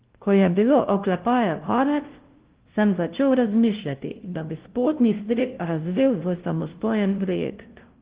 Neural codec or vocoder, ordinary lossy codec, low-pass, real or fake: codec, 16 kHz, 0.5 kbps, FunCodec, trained on LibriTTS, 25 frames a second; Opus, 16 kbps; 3.6 kHz; fake